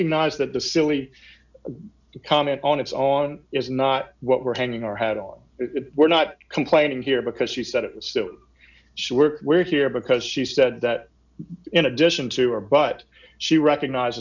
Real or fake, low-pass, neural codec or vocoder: real; 7.2 kHz; none